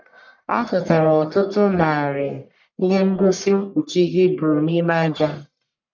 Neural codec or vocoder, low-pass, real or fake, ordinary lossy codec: codec, 44.1 kHz, 1.7 kbps, Pupu-Codec; 7.2 kHz; fake; none